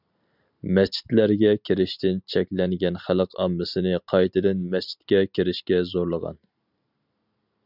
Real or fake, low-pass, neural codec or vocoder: real; 5.4 kHz; none